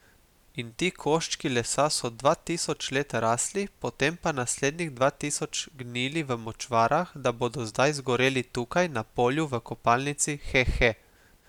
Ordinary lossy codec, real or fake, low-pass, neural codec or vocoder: none; real; 19.8 kHz; none